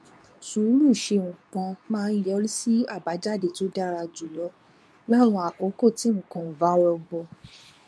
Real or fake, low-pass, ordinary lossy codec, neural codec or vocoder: fake; none; none; codec, 24 kHz, 0.9 kbps, WavTokenizer, medium speech release version 2